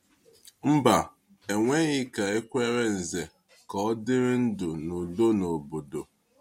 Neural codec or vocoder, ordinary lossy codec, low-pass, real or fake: none; MP3, 64 kbps; 19.8 kHz; real